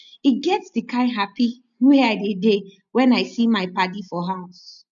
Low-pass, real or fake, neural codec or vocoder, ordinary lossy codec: 7.2 kHz; real; none; none